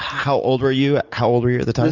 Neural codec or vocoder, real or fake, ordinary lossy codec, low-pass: none; real; Opus, 64 kbps; 7.2 kHz